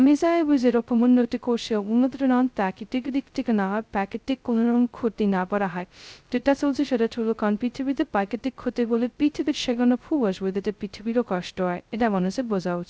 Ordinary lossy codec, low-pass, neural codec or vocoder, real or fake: none; none; codec, 16 kHz, 0.2 kbps, FocalCodec; fake